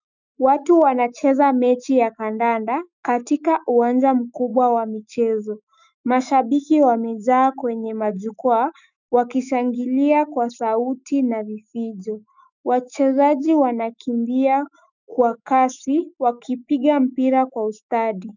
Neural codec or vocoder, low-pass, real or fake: autoencoder, 48 kHz, 128 numbers a frame, DAC-VAE, trained on Japanese speech; 7.2 kHz; fake